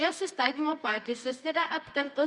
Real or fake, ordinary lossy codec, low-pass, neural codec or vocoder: fake; Opus, 64 kbps; 10.8 kHz; codec, 24 kHz, 0.9 kbps, WavTokenizer, medium music audio release